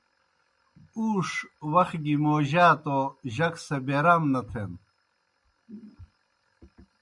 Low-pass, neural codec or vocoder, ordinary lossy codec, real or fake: 10.8 kHz; none; MP3, 96 kbps; real